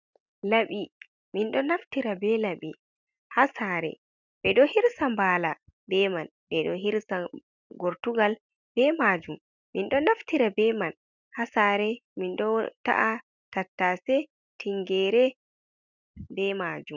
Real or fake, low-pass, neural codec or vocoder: real; 7.2 kHz; none